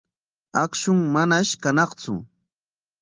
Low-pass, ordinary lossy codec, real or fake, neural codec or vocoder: 7.2 kHz; Opus, 32 kbps; real; none